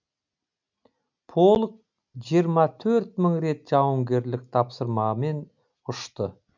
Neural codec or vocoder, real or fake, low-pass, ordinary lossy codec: none; real; 7.2 kHz; none